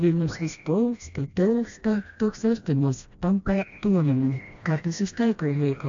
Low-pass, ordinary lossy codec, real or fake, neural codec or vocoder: 7.2 kHz; AAC, 64 kbps; fake; codec, 16 kHz, 1 kbps, FreqCodec, smaller model